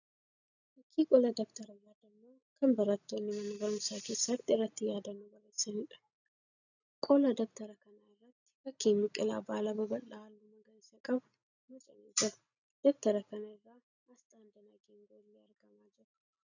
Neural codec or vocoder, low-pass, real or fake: none; 7.2 kHz; real